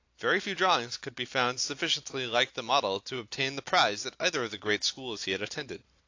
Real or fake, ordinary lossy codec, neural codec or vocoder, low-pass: real; AAC, 48 kbps; none; 7.2 kHz